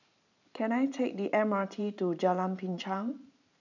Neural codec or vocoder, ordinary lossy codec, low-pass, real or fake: vocoder, 44.1 kHz, 128 mel bands every 512 samples, BigVGAN v2; none; 7.2 kHz; fake